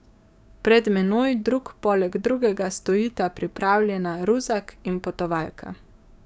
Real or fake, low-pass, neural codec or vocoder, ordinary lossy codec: fake; none; codec, 16 kHz, 6 kbps, DAC; none